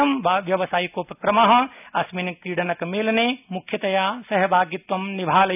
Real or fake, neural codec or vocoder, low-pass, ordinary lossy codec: real; none; 3.6 kHz; none